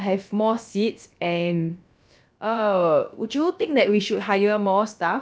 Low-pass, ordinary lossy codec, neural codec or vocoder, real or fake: none; none; codec, 16 kHz, about 1 kbps, DyCAST, with the encoder's durations; fake